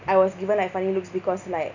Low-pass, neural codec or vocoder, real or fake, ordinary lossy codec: 7.2 kHz; none; real; none